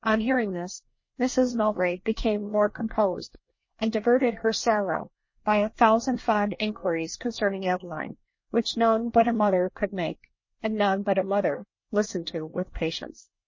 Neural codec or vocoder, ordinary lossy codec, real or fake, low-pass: codec, 24 kHz, 1 kbps, SNAC; MP3, 32 kbps; fake; 7.2 kHz